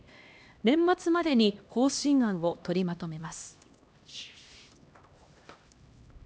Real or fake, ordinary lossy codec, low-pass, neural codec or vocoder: fake; none; none; codec, 16 kHz, 1 kbps, X-Codec, HuBERT features, trained on LibriSpeech